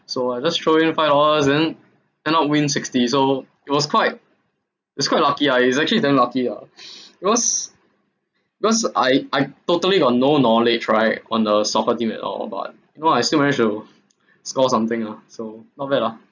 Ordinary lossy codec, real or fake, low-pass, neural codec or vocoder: none; real; 7.2 kHz; none